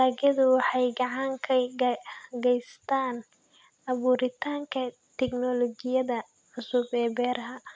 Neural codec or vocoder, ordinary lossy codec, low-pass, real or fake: none; none; none; real